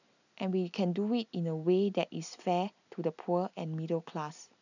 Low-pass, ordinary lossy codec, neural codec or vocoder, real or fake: 7.2 kHz; none; none; real